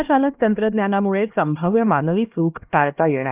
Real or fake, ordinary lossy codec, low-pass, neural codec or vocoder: fake; Opus, 32 kbps; 3.6 kHz; codec, 16 kHz, 1 kbps, X-Codec, HuBERT features, trained on LibriSpeech